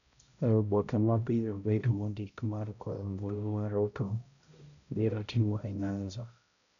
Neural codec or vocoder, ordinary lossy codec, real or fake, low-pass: codec, 16 kHz, 0.5 kbps, X-Codec, HuBERT features, trained on balanced general audio; none; fake; 7.2 kHz